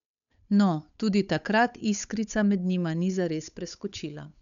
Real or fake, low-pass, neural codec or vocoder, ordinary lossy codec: fake; 7.2 kHz; codec, 16 kHz, 8 kbps, FunCodec, trained on Chinese and English, 25 frames a second; none